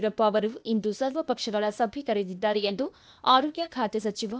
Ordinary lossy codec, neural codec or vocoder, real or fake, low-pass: none; codec, 16 kHz, 0.8 kbps, ZipCodec; fake; none